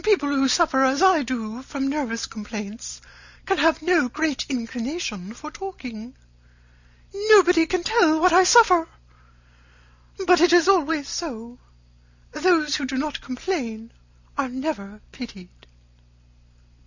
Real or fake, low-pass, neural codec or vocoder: real; 7.2 kHz; none